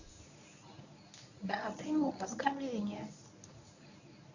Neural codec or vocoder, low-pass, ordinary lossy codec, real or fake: codec, 24 kHz, 0.9 kbps, WavTokenizer, medium speech release version 1; 7.2 kHz; none; fake